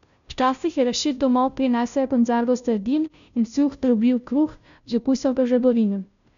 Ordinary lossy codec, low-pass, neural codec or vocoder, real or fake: none; 7.2 kHz; codec, 16 kHz, 0.5 kbps, FunCodec, trained on Chinese and English, 25 frames a second; fake